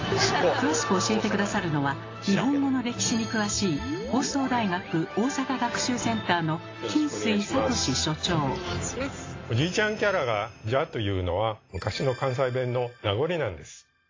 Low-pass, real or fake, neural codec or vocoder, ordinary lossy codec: 7.2 kHz; real; none; AAC, 32 kbps